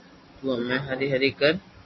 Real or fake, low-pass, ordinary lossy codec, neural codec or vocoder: real; 7.2 kHz; MP3, 24 kbps; none